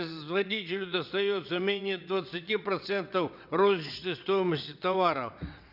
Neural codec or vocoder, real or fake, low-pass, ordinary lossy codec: vocoder, 22.05 kHz, 80 mel bands, WaveNeXt; fake; 5.4 kHz; none